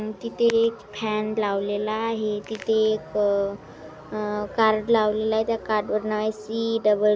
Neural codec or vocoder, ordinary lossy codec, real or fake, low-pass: none; none; real; none